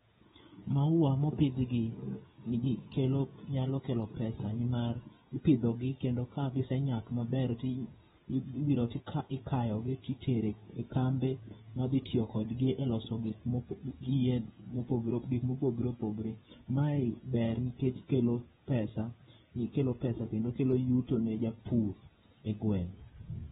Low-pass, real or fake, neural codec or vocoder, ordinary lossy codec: 7.2 kHz; fake; codec, 16 kHz, 16 kbps, FreqCodec, smaller model; AAC, 16 kbps